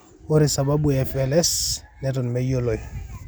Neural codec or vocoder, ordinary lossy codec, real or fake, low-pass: none; none; real; none